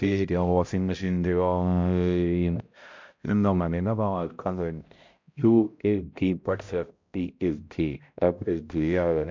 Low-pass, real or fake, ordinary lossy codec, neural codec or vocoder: 7.2 kHz; fake; MP3, 48 kbps; codec, 16 kHz, 0.5 kbps, X-Codec, HuBERT features, trained on balanced general audio